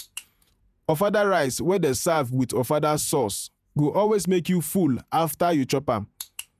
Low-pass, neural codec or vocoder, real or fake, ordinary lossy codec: 14.4 kHz; vocoder, 48 kHz, 128 mel bands, Vocos; fake; none